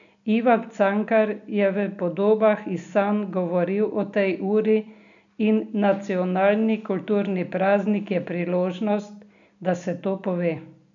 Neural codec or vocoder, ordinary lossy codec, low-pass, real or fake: none; none; 7.2 kHz; real